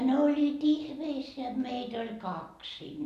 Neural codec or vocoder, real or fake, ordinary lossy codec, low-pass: none; real; none; 14.4 kHz